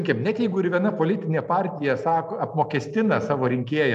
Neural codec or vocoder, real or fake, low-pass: none; real; 14.4 kHz